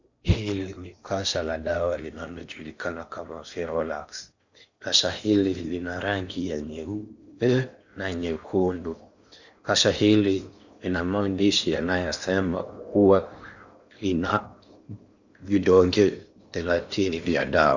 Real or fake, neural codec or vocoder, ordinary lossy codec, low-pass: fake; codec, 16 kHz in and 24 kHz out, 0.8 kbps, FocalCodec, streaming, 65536 codes; Opus, 64 kbps; 7.2 kHz